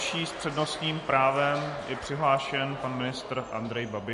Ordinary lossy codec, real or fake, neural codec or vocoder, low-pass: MP3, 48 kbps; real; none; 14.4 kHz